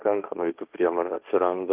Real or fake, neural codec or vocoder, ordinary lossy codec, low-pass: fake; codec, 16 kHz, 1.1 kbps, Voila-Tokenizer; Opus, 24 kbps; 3.6 kHz